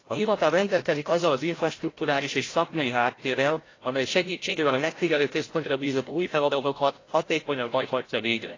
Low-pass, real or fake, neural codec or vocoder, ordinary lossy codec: 7.2 kHz; fake; codec, 16 kHz, 0.5 kbps, FreqCodec, larger model; AAC, 32 kbps